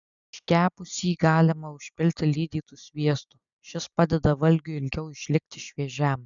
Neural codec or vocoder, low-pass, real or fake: none; 7.2 kHz; real